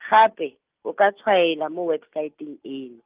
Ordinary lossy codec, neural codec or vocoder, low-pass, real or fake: Opus, 24 kbps; none; 3.6 kHz; real